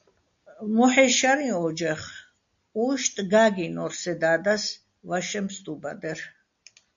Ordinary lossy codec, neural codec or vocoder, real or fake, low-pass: AAC, 64 kbps; none; real; 7.2 kHz